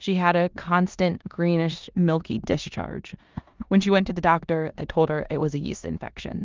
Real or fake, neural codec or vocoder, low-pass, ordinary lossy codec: fake; codec, 16 kHz in and 24 kHz out, 0.9 kbps, LongCat-Audio-Codec, four codebook decoder; 7.2 kHz; Opus, 24 kbps